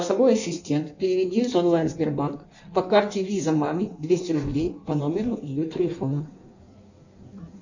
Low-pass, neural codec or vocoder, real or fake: 7.2 kHz; codec, 16 kHz in and 24 kHz out, 1.1 kbps, FireRedTTS-2 codec; fake